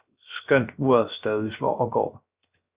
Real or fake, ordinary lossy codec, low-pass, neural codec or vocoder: fake; Opus, 64 kbps; 3.6 kHz; codec, 16 kHz, 0.7 kbps, FocalCodec